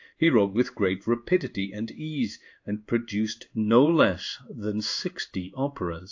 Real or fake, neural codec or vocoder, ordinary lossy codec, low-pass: fake; codec, 16 kHz in and 24 kHz out, 1 kbps, XY-Tokenizer; AAC, 48 kbps; 7.2 kHz